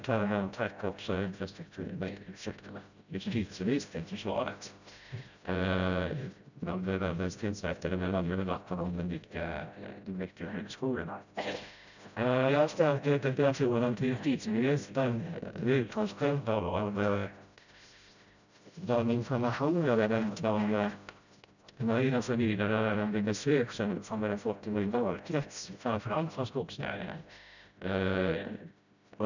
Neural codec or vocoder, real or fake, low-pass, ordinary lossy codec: codec, 16 kHz, 0.5 kbps, FreqCodec, smaller model; fake; 7.2 kHz; none